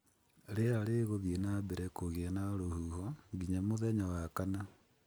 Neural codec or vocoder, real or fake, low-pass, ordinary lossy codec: none; real; none; none